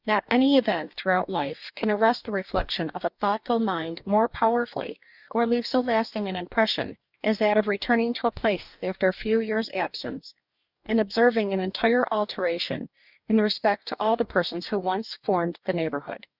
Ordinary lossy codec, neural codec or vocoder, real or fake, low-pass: Opus, 64 kbps; codec, 44.1 kHz, 2.6 kbps, DAC; fake; 5.4 kHz